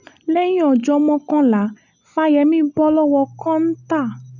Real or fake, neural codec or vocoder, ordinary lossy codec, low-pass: real; none; none; 7.2 kHz